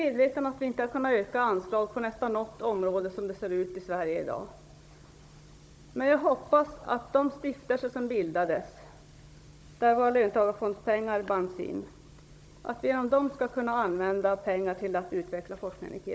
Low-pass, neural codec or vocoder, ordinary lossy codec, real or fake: none; codec, 16 kHz, 16 kbps, FunCodec, trained on Chinese and English, 50 frames a second; none; fake